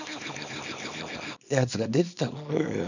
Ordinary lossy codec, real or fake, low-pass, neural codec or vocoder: none; fake; 7.2 kHz; codec, 24 kHz, 0.9 kbps, WavTokenizer, small release